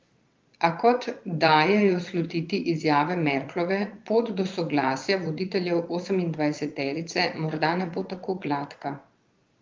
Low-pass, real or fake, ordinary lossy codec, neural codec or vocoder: 7.2 kHz; fake; Opus, 32 kbps; vocoder, 22.05 kHz, 80 mel bands, Vocos